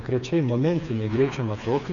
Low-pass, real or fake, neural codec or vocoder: 7.2 kHz; fake; codec, 16 kHz, 4 kbps, FreqCodec, smaller model